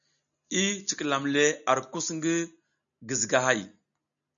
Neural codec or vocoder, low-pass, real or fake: none; 7.2 kHz; real